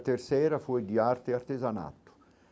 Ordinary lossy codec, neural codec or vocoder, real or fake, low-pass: none; none; real; none